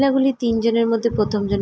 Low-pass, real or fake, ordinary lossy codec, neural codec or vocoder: none; real; none; none